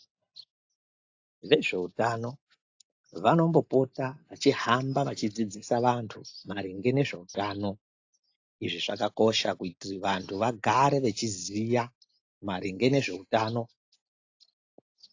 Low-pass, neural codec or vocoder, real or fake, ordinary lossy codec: 7.2 kHz; none; real; AAC, 48 kbps